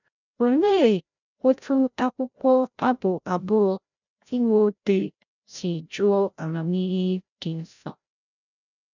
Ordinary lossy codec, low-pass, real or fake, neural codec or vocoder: none; 7.2 kHz; fake; codec, 16 kHz, 0.5 kbps, FreqCodec, larger model